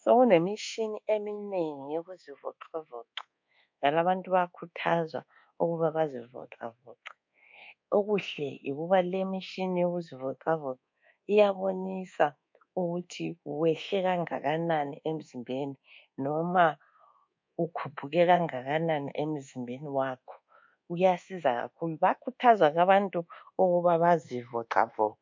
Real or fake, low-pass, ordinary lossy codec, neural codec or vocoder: fake; 7.2 kHz; MP3, 48 kbps; codec, 24 kHz, 1.2 kbps, DualCodec